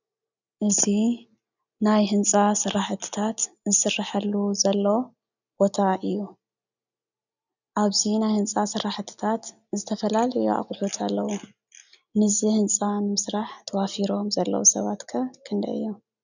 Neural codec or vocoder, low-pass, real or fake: none; 7.2 kHz; real